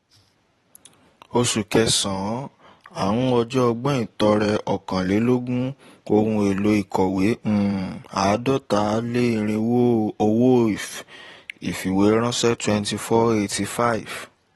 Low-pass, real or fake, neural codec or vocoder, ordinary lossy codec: 19.8 kHz; fake; vocoder, 44.1 kHz, 128 mel bands every 512 samples, BigVGAN v2; AAC, 32 kbps